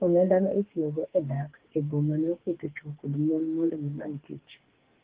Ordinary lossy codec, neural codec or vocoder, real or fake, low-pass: Opus, 16 kbps; codec, 44.1 kHz, 2.6 kbps, SNAC; fake; 3.6 kHz